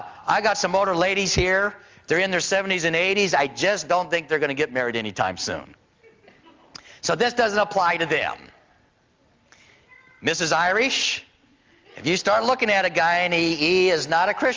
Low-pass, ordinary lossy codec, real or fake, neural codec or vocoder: 7.2 kHz; Opus, 32 kbps; real; none